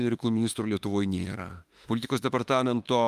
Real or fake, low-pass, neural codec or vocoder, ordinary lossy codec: fake; 14.4 kHz; autoencoder, 48 kHz, 32 numbers a frame, DAC-VAE, trained on Japanese speech; Opus, 32 kbps